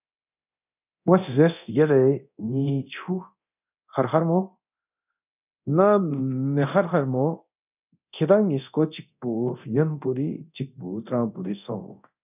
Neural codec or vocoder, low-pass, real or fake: codec, 24 kHz, 0.9 kbps, DualCodec; 3.6 kHz; fake